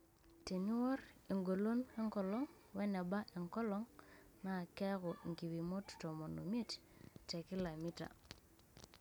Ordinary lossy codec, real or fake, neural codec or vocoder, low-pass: none; real; none; none